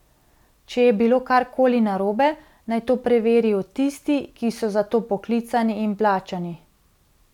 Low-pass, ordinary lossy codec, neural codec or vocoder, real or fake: 19.8 kHz; none; none; real